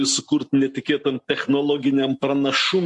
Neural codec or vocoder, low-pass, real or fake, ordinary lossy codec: none; 10.8 kHz; real; MP3, 48 kbps